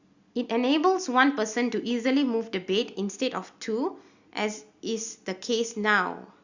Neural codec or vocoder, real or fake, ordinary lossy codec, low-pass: none; real; Opus, 64 kbps; 7.2 kHz